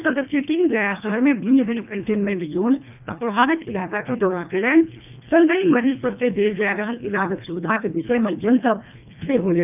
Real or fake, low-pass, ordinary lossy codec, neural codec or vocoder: fake; 3.6 kHz; none; codec, 24 kHz, 1.5 kbps, HILCodec